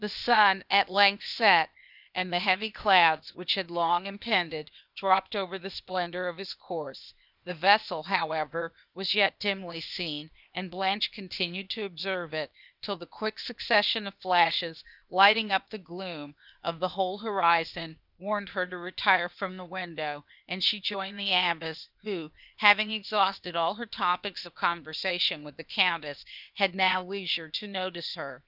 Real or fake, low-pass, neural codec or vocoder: fake; 5.4 kHz; codec, 16 kHz, 0.8 kbps, ZipCodec